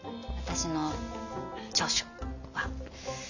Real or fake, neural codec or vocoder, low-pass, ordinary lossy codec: real; none; 7.2 kHz; none